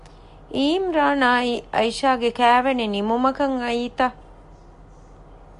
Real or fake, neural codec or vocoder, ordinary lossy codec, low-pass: real; none; AAC, 64 kbps; 10.8 kHz